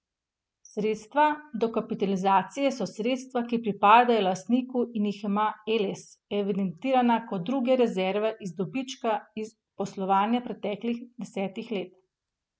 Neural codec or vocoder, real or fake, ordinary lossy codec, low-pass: none; real; none; none